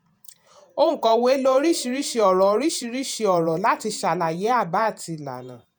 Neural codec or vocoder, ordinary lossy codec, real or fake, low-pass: vocoder, 48 kHz, 128 mel bands, Vocos; none; fake; none